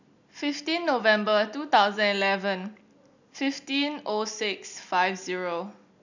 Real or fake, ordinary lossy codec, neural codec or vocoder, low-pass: real; none; none; 7.2 kHz